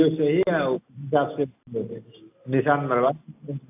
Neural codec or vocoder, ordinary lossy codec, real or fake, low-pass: none; AAC, 32 kbps; real; 3.6 kHz